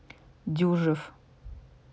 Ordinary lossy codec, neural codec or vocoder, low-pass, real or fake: none; none; none; real